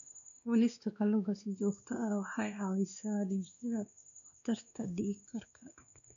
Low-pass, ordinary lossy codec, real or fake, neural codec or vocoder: 7.2 kHz; none; fake; codec, 16 kHz, 2 kbps, X-Codec, WavLM features, trained on Multilingual LibriSpeech